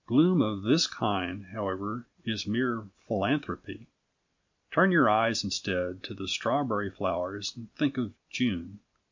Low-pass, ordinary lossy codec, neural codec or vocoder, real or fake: 7.2 kHz; MP3, 48 kbps; none; real